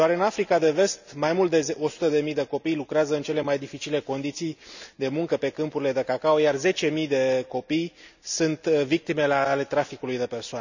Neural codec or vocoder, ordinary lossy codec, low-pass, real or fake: none; none; 7.2 kHz; real